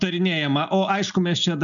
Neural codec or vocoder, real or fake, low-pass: none; real; 7.2 kHz